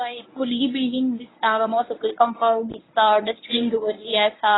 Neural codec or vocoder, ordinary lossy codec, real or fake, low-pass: codec, 24 kHz, 0.9 kbps, WavTokenizer, medium speech release version 1; AAC, 16 kbps; fake; 7.2 kHz